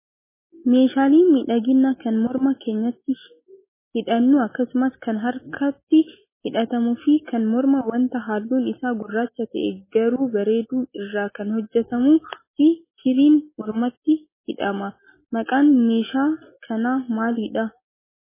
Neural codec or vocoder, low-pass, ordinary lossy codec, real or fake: none; 3.6 kHz; MP3, 16 kbps; real